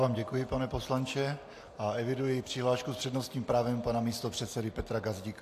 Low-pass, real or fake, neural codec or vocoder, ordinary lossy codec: 14.4 kHz; real; none; AAC, 64 kbps